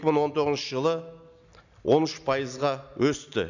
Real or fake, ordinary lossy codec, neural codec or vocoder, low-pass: real; none; none; 7.2 kHz